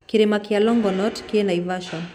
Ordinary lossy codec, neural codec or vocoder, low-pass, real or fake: MP3, 96 kbps; none; 19.8 kHz; real